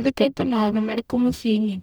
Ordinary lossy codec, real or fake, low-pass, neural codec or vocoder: none; fake; none; codec, 44.1 kHz, 0.9 kbps, DAC